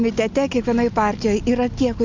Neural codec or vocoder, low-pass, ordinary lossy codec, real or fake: none; 7.2 kHz; MP3, 64 kbps; real